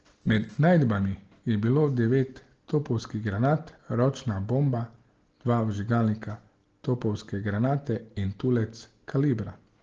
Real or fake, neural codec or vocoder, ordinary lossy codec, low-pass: real; none; Opus, 16 kbps; 7.2 kHz